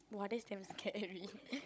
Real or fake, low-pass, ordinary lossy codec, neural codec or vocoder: fake; none; none; codec, 16 kHz, 16 kbps, FunCodec, trained on Chinese and English, 50 frames a second